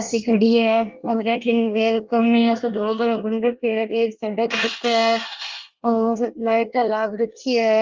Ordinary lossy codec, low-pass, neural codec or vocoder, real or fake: Opus, 32 kbps; 7.2 kHz; codec, 24 kHz, 1 kbps, SNAC; fake